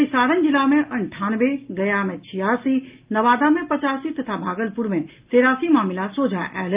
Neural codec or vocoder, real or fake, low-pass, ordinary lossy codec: none; real; 3.6 kHz; Opus, 24 kbps